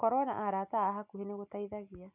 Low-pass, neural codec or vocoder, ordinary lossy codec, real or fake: 3.6 kHz; none; none; real